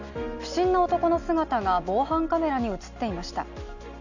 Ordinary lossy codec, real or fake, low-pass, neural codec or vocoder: none; real; 7.2 kHz; none